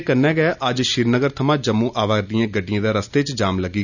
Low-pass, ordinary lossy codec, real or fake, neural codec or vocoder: 7.2 kHz; none; real; none